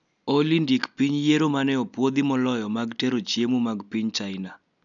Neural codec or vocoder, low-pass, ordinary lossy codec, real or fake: none; 7.2 kHz; none; real